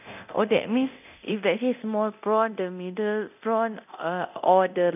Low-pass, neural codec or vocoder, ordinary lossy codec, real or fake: 3.6 kHz; codec, 24 kHz, 0.9 kbps, DualCodec; none; fake